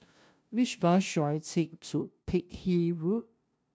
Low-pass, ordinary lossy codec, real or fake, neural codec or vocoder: none; none; fake; codec, 16 kHz, 0.5 kbps, FunCodec, trained on LibriTTS, 25 frames a second